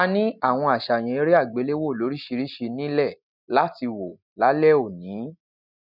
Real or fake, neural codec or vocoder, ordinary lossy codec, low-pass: real; none; none; 5.4 kHz